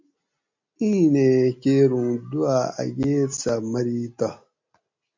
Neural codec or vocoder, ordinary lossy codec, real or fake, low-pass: none; MP3, 48 kbps; real; 7.2 kHz